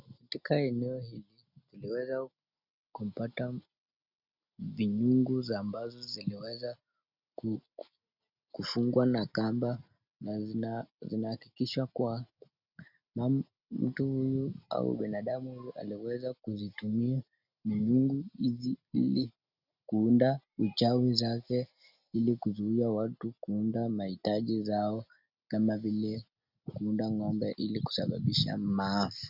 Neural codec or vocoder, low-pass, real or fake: none; 5.4 kHz; real